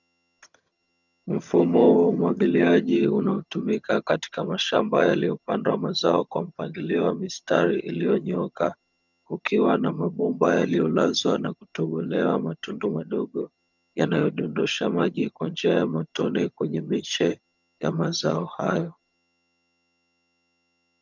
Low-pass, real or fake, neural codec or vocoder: 7.2 kHz; fake; vocoder, 22.05 kHz, 80 mel bands, HiFi-GAN